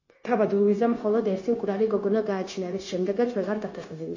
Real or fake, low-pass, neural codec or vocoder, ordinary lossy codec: fake; 7.2 kHz; codec, 16 kHz, 0.9 kbps, LongCat-Audio-Codec; MP3, 32 kbps